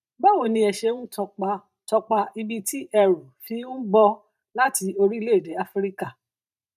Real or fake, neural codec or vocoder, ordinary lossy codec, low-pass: fake; vocoder, 44.1 kHz, 128 mel bands every 512 samples, BigVGAN v2; none; 14.4 kHz